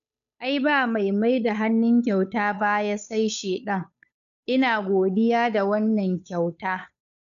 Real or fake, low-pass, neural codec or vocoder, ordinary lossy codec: fake; 7.2 kHz; codec, 16 kHz, 8 kbps, FunCodec, trained on Chinese and English, 25 frames a second; none